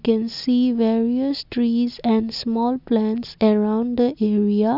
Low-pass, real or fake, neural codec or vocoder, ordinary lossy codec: 5.4 kHz; real; none; none